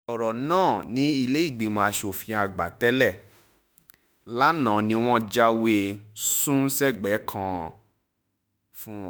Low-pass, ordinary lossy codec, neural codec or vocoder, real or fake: none; none; autoencoder, 48 kHz, 32 numbers a frame, DAC-VAE, trained on Japanese speech; fake